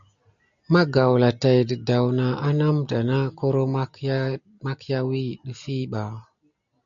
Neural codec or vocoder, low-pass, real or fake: none; 7.2 kHz; real